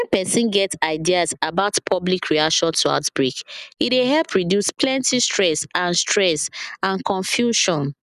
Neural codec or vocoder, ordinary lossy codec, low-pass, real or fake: none; none; 14.4 kHz; real